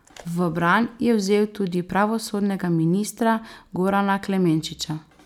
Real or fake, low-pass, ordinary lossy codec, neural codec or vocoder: real; 19.8 kHz; none; none